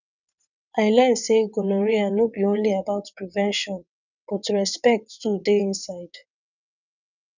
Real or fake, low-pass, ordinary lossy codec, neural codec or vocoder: fake; 7.2 kHz; none; vocoder, 22.05 kHz, 80 mel bands, WaveNeXt